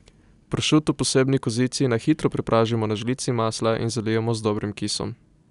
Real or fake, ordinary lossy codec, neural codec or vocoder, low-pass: real; none; none; 10.8 kHz